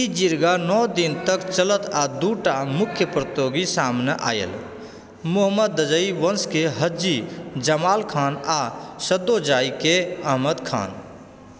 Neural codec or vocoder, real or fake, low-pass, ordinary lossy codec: none; real; none; none